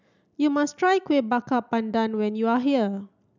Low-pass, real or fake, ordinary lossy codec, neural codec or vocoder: 7.2 kHz; real; none; none